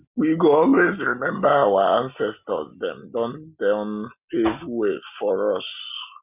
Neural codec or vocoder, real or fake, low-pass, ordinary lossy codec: none; real; 3.6 kHz; none